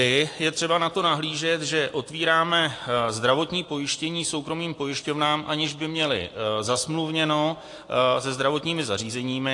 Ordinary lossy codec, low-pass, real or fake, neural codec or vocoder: AAC, 48 kbps; 10.8 kHz; real; none